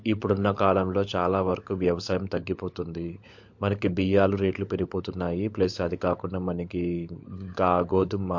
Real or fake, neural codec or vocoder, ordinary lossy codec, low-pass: fake; codec, 16 kHz, 16 kbps, FunCodec, trained on LibriTTS, 50 frames a second; MP3, 48 kbps; 7.2 kHz